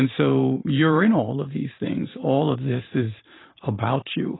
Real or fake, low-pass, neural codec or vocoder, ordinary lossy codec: fake; 7.2 kHz; vocoder, 44.1 kHz, 128 mel bands every 512 samples, BigVGAN v2; AAC, 16 kbps